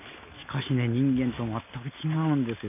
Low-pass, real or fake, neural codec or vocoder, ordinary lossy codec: 3.6 kHz; real; none; none